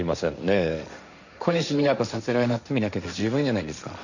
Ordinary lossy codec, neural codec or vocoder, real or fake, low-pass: none; codec, 16 kHz, 1.1 kbps, Voila-Tokenizer; fake; 7.2 kHz